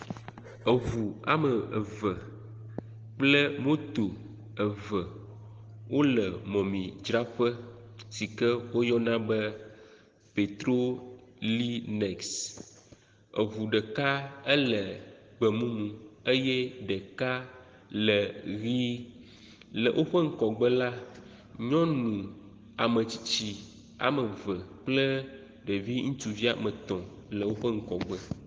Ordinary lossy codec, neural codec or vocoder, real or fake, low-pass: Opus, 16 kbps; none; real; 7.2 kHz